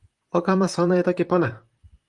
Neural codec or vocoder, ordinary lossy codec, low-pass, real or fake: vocoder, 44.1 kHz, 128 mel bands, Pupu-Vocoder; Opus, 24 kbps; 10.8 kHz; fake